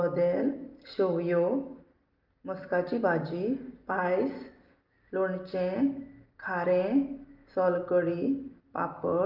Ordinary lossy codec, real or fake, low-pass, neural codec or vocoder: Opus, 32 kbps; real; 5.4 kHz; none